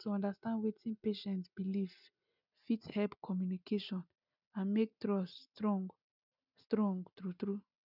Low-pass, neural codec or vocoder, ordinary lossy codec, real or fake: 5.4 kHz; none; none; real